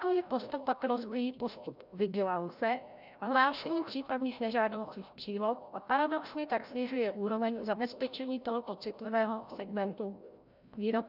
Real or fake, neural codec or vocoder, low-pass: fake; codec, 16 kHz, 0.5 kbps, FreqCodec, larger model; 5.4 kHz